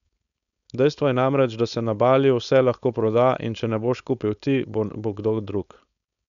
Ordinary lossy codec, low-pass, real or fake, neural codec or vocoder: none; 7.2 kHz; fake; codec, 16 kHz, 4.8 kbps, FACodec